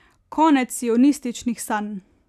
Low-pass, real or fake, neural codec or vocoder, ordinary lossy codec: 14.4 kHz; real; none; none